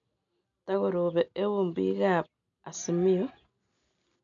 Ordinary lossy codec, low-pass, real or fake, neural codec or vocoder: none; 7.2 kHz; real; none